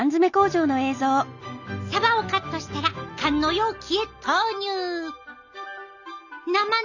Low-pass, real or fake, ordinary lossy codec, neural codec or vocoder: 7.2 kHz; real; none; none